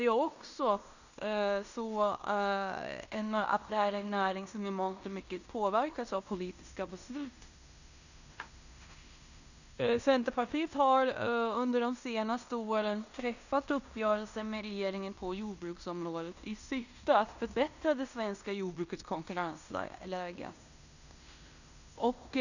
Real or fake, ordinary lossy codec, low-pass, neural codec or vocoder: fake; none; 7.2 kHz; codec, 16 kHz in and 24 kHz out, 0.9 kbps, LongCat-Audio-Codec, fine tuned four codebook decoder